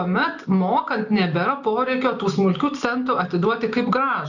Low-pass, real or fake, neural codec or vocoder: 7.2 kHz; real; none